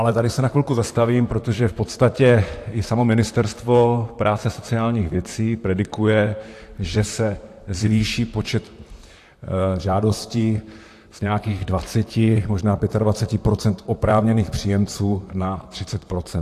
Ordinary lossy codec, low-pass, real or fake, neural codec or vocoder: AAC, 64 kbps; 14.4 kHz; fake; vocoder, 44.1 kHz, 128 mel bands, Pupu-Vocoder